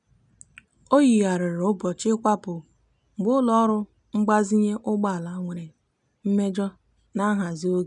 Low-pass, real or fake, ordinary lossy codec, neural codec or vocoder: 10.8 kHz; real; none; none